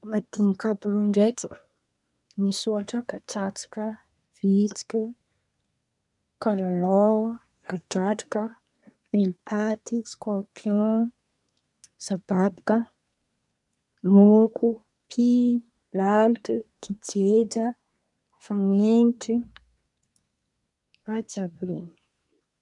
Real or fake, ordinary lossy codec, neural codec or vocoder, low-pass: fake; none; codec, 24 kHz, 1 kbps, SNAC; 10.8 kHz